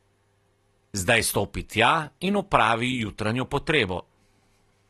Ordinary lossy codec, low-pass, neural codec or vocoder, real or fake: AAC, 32 kbps; 14.4 kHz; none; real